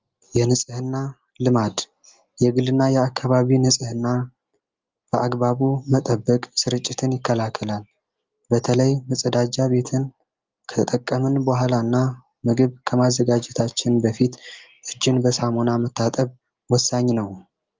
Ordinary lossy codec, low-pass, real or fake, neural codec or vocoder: Opus, 32 kbps; 7.2 kHz; real; none